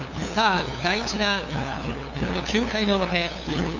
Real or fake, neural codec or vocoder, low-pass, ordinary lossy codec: fake; codec, 16 kHz, 2 kbps, FunCodec, trained on LibriTTS, 25 frames a second; 7.2 kHz; none